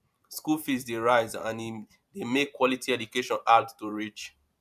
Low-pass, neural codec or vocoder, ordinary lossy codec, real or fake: 14.4 kHz; vocoder, 44.1 kHz, 128 mel bands every 512 samples, BigVGAN v2; none; fake